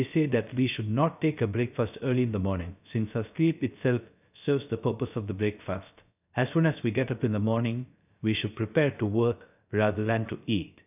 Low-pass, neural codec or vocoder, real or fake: 3.6 kHz; codec, 16 kHz, 0.3 kbps, FocalCodec; fake